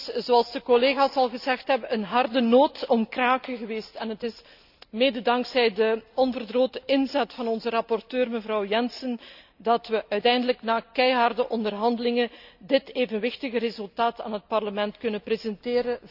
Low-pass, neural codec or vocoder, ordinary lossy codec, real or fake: 5.4 kHz; none; none; real